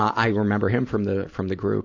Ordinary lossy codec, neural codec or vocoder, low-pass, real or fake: AAC, 48 kbps; vocoder, 44.1 kHz, 128 mel bands every 512 samples, BigVGAN v2; 7.2 kHz; fake